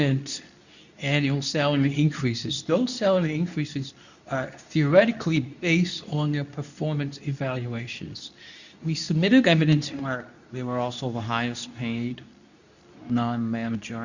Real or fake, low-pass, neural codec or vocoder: fake; 7.2 kHz; codec, 24 kHz, 0.9 kbps, WavTokenizer, medium speech release version 2